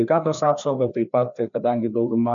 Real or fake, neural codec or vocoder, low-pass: fake; codec, 16 kHz, 2 kbps, FreqCodec, larger model; 7.2 kHz